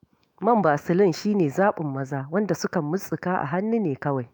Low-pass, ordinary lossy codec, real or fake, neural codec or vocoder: none; none; fake; autoencoder, 48 kHz, 128 numbers a frame, DAC-VAE, trained on Japanese speech